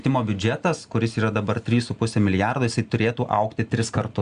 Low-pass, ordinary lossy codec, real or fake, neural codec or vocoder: 9.9 kHz; Opus, 64 kbps; real; none